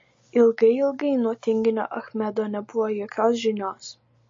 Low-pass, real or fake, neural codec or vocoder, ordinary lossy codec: 7.2 kHz; real; none; MP3, 32 kbps